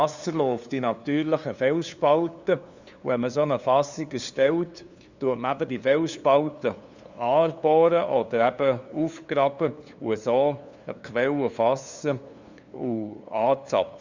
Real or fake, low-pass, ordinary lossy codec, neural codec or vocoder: fake; 7.2 kHz; Opus, 64 kbps; codec, 16 kHz, 2 kbps, FunCodec, trained on LibriTTS, 25 frames a second